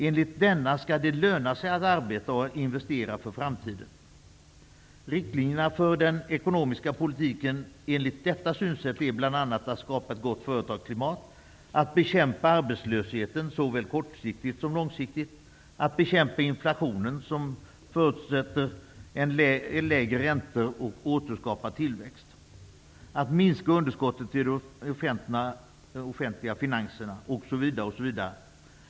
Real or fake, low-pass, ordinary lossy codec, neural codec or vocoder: real; none; none; none